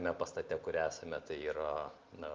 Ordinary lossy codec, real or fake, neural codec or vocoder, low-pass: Opus, 24 kbps; real; none; 7.2 kHz